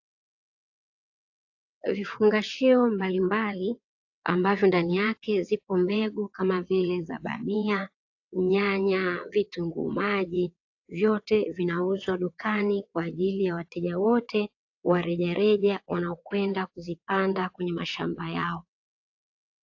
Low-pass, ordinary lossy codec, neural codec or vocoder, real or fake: 7.2 kHz; AAC, 48 kbps; vocoder, 22.05 kHz, 80 mel bands, WaveNeXt; fake